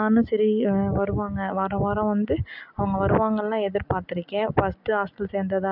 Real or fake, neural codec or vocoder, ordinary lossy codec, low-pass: real; none; none; 5.4 kHz